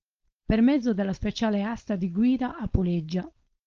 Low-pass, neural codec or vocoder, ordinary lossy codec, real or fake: 7.2 kHz; codec, 16 kHz, 4.8 kbps, FACodec; Opus, 24 kbps; fake